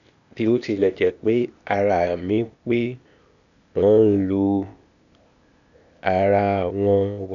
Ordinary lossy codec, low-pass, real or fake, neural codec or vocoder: none; 7.2 kHz; fake; codec, 16 kHz, 0.8 kbps, ZipCodec